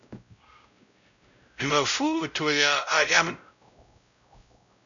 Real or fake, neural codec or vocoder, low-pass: fake; codec, 16 kHz, 0.5 kbps, X-Codec, WavLM features, trained on Multilingual LibriSpeech; 7.2 kHz